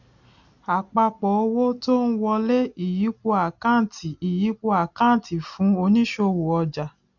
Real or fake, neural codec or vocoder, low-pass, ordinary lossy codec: real; none; 7.2 kHz; none